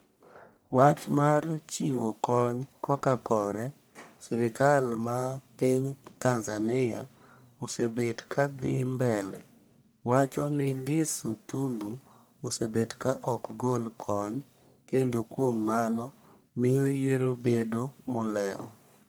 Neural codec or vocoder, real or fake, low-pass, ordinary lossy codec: codec, 44.1 kHz, 1.7 kbps, Pupu-Codec; fake; none; none